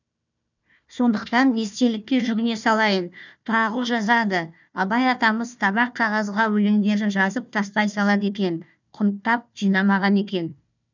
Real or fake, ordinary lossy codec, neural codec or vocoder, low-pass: fake; none; codec, 16 kHz, 1 kbps, FunCodec, trained on Chinese and English, 50 frames a second; 7.2 kHz